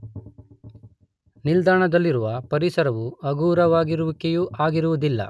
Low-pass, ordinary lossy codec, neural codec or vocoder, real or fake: none; none; none; real